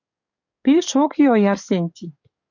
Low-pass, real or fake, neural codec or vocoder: 7.2 kHz; fake; codec, 44.1 kHz, 7.8 kbps, DAC